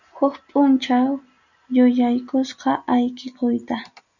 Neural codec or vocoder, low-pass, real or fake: none; 7.2 kHz; real